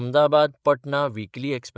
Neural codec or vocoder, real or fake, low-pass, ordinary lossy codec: codec, 16 kHz, 16 kbps, FreqCodec, larger model; fake; none; none